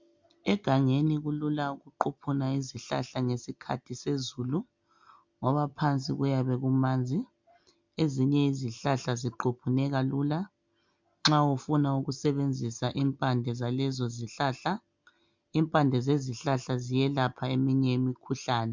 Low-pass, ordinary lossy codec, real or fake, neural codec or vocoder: 7.2 kHz; MP3, 64 kbps; real; none